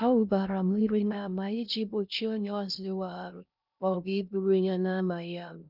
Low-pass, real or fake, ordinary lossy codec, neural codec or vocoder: 5.4 kHz; fake; none; codec, 16 kHz in and 24 kHz out, 0.6 kbps, FocalCodec, streaming, 2048 codes